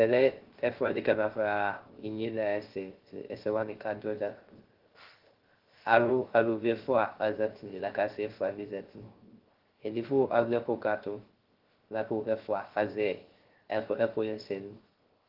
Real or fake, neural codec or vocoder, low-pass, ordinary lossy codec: fake; codec, 16 kHz, 0.3 kbps, FocalCodec; 5.4 kHz; Opus, 16 kbps